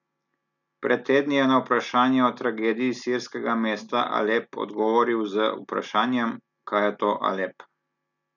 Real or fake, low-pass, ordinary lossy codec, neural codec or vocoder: real; 7.2 kHz; none; none